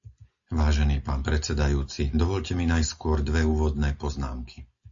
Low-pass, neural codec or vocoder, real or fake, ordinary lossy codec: 7.2 kHz; none; real; AAC, 64 kbps